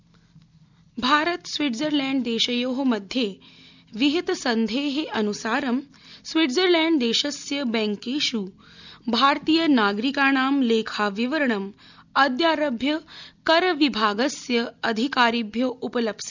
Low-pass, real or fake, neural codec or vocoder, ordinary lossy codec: 7.2 kHz; real; none; none